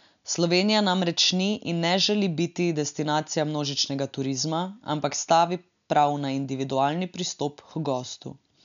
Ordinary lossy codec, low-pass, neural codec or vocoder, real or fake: none; 7.2 kHz; none; real